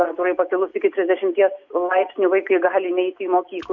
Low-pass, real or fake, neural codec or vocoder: 7.2 kHz; real; none